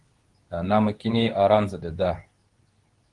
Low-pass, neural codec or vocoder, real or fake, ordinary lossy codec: 10.8 kHz; codec, 24 kHz, 0.9 kbps, WavTokenizer, medium speech release version 2; fake; Opus, 24 kbps